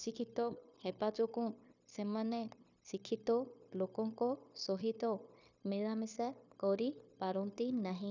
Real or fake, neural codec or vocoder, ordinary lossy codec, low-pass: fake; codec, 16 kHz, 0.9 kbps, LongCat-Audio-Codec; none; 7.2 kHz